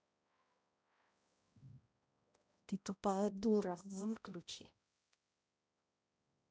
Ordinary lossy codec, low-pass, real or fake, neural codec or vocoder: none; none; fake; codec, 16 kHz, 0.5 kbps, X-Codec, HuBERT features, trained on balanced general audio